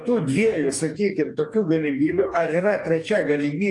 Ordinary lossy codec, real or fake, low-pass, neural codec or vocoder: AAC, 64 kbps; fake; 10.8 kHz; codec, 44.1 kHz, 2.6 kbps, DAC